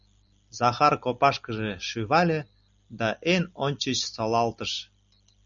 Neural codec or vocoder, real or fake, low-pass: none; real; 7.2 kHz